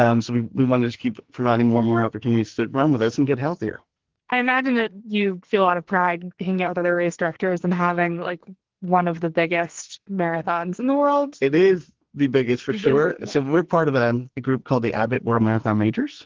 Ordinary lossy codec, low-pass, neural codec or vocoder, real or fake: Opus, 16 kbps; 7.2 kHz; codec, 44.1 kHz, 2.6 kbps, SNAC; fake